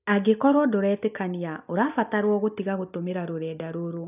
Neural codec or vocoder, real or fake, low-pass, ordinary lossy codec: none; real; 3.6 kHz; none